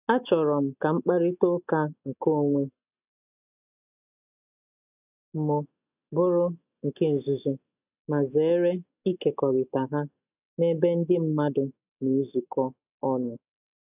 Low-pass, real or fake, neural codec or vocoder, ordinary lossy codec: 3.6 kHz; real; none; none